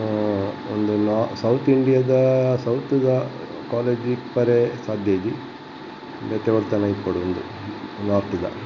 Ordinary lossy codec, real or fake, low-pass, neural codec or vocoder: none; real; 7.2 kHz; none